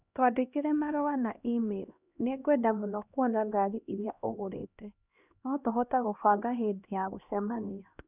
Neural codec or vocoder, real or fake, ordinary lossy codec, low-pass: codec, 16 kHz, 1 kbps, X-Codec, HuBERT features, trained on LibriSpeech; fake; none; 3.6 kHz